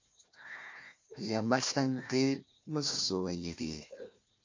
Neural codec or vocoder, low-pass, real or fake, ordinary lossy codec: codec, 16 kHz, 1 kbps, FunCodec, trained on Chinese and English, 50 frames a second; 7.2 kHz; fake; MP3, 48 kbps